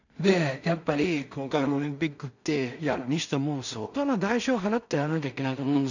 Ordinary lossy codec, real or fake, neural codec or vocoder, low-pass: none; fake; codec, 16 kHz in and 24 kHz out, 0.4 kbps, LongCat-Audio-Codec, two codebook decoder; 7.2 kHz